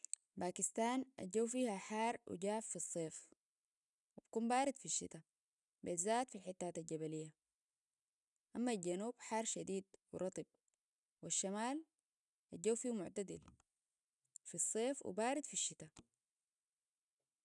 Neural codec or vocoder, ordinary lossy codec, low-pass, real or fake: none; none; 10.8 kHz; real